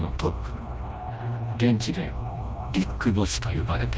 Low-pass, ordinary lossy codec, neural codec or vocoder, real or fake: none; none; codec, 16 kHz, 1 kbps, FreqCodec, smaller model; fake